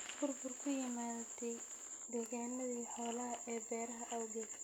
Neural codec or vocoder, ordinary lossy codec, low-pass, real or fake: none; none; none; real